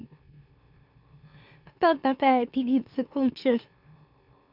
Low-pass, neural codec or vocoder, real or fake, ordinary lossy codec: 5.4 kHz; autoencoder, 44.1 kHz, a latent of 192 numbers a frame, MeloTTS; fake; none